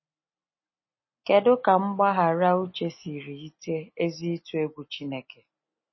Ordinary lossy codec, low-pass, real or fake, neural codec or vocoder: MP3, 24 kbps; 7.2 kHz; real; none